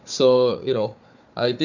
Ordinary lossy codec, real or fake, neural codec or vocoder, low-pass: none; fake; codec, 16 kHz, 4 kbps, FunCodec, trained on Chinese and English, 50 frames a second; 7.2 kHz